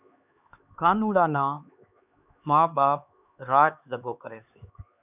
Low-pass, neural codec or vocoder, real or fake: 3.6 kHz; codec, 16 kHz, 4 kbps, X-Codec, HuBERT features, trained on LibriSpeech; fake